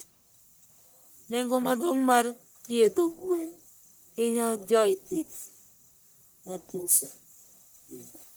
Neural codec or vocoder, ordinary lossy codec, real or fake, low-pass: codec, 44.1 kHz, 1.7 kbps, Pupu-Codec; none; fake; none